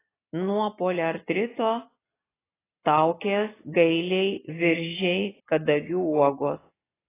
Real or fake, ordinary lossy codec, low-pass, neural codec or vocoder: real; AAC, 16 kbps; 3.6 kHz; none